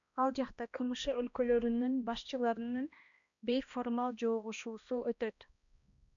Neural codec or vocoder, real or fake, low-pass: codec, 16 kHz, 1 kbps, X-Codec, HuBERT features, trained on balanced general audio; fake; 7.2 kHz